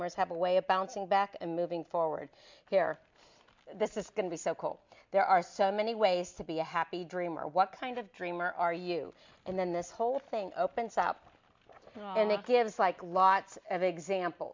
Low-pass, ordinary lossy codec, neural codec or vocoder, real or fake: 7.2 kHz; MP3, 64 kbps; none; real